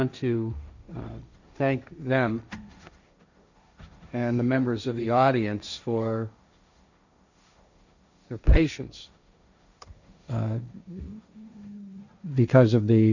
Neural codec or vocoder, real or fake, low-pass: codec, 16 kHz, 1.1 kbps, Voila-Tokenizer; fake; 7.2 kHz